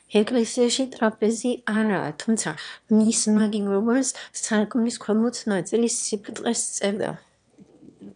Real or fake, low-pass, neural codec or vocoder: fake; 9.9 kHz; autoencoder, 22.05 kHz, a latent of 192 numbers a frame, VITS, trained on one speaker